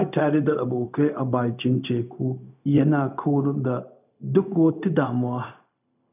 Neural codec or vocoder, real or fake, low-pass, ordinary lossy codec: codec, 16 kHz, 0.4 kbps, LongCat-Audio-Codec; fake; 3.6 kHz; none